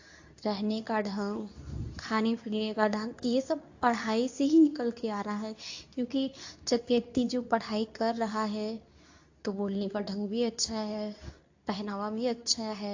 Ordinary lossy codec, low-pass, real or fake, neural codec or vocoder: AAC, 48 kbps; 7.2 kHz; fake; codec, 24 kHz, 0.9 kbps, WavTokenizer, medium speech release version 2